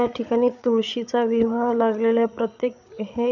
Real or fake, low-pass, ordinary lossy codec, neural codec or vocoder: fake; 7.2 kHz; none; codec, 16 kHz, 8 kbps, FreqCodec, larger model